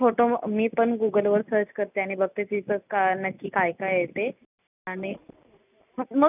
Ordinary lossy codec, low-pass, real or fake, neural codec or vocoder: none; 3.6 kHz; real; none